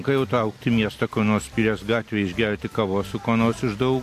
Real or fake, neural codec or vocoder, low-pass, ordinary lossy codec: real; none; 14.4 kHz; AAC, 64 kbps